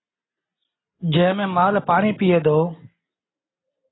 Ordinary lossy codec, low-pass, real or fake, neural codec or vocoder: AAC, 16 kbps; 7.2 kHz; real; none